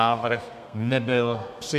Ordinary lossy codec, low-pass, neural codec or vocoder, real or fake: MP3, 96 kbps; 14.4 kHz; codec, 32 kHz, 1.9 kbps, SNAC; fake